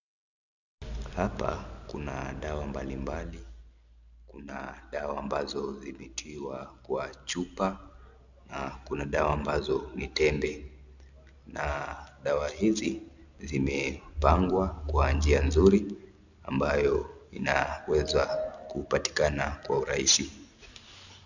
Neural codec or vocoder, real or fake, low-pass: none; real; 7.2 kHz